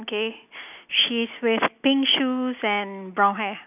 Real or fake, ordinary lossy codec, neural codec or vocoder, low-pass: real; none; none; 3.6 kHz